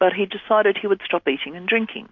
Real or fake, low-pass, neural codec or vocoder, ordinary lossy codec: real; 7.2 kHz; none; MP3, 48 kbps